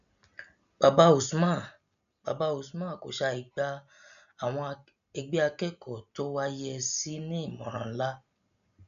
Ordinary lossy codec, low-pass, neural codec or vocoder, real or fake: none; 7.2 kHz; none; real